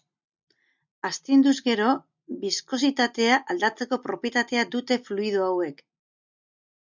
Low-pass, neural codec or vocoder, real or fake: 7.2 kHz; none; real